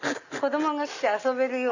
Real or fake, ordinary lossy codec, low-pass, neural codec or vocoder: real; none; 7.2 kHz; none